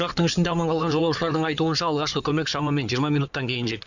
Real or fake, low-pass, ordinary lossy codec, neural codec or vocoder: fake; 7.2 kHz; none; codec, 16 kHz in and 24 kHz out, 2.2 kbps, FireRedTTS-2 codec